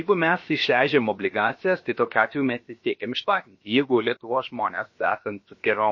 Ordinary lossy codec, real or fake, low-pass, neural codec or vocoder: MP3, 32 kbps; fake; 7.2 kHz; codec, 16 kHz, about 1 kbps, DyCAST, with the encoder's durations